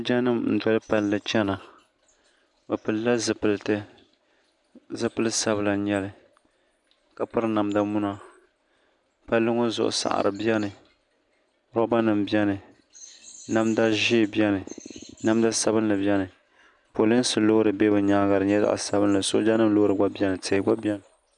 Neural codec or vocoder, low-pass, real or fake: none; 10.8 kHz; real